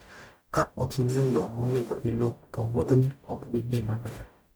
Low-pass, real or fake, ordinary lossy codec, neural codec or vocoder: none; fake; none; codec, 44.1 kHz, 0.9 kbps, DAC